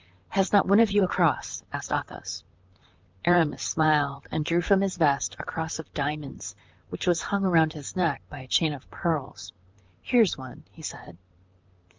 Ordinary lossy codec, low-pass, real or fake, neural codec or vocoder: Opus, 24 kbps; 7.2 kHz; fake; vocoder, 44.1 kHz, 128 mel bands, Pupu-Vocoder